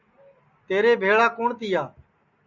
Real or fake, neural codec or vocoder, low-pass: real; none; 7.2 kHz